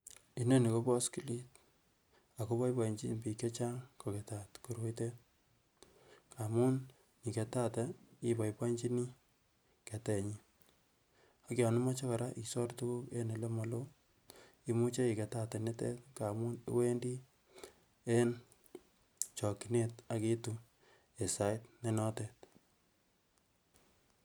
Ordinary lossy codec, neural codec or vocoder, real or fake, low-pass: none; none; real; none